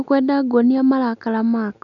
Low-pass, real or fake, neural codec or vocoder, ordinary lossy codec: 7.2 kHz; real; none; none